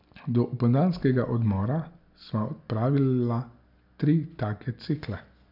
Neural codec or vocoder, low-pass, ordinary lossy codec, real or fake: none; 5.4 kHz; none; real